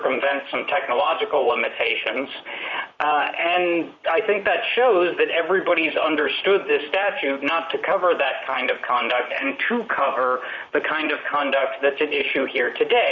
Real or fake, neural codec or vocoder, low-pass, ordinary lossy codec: fake; vocoder, 44.1 kHz, 128 mel bands, Pupu-Vocoder; 7.2 kHz; Opus, 64 kbps